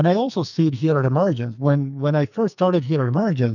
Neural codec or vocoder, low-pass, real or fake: codec, 44.1 kHz, 2.6 kbps, SNAC; 7.2 kHz; fake